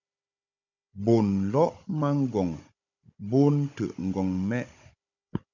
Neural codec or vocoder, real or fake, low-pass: codec, 16 kHz, 16 kbps, FunCodec, trained on Chinese and English, 50 frames a second; fake; 7.2 kHz